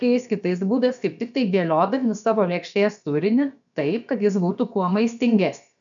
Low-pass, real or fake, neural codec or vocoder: 7.2 kHz; fake; codec, 16 kHz, about 1 kbps, DyCAST, with the encoder's durations